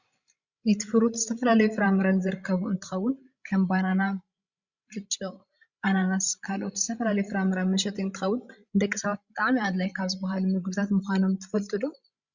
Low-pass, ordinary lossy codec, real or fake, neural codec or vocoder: 7.2 kHz; Opus, 64 kbps; fake; codec, 16 kHz, 16 kbps, FreqCodec, larger model